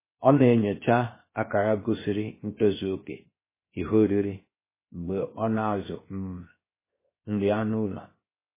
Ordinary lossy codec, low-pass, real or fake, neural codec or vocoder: MP3, 16 kbps; 3.6 kHz; fake; codec, 16 kHz, 0.7 kbps, FocalCodec